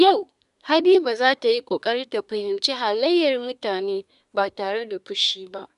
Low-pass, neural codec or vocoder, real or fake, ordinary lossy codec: 10.8 kHz; codec, 24 kHz, 1 kbps, SNAC; fake; none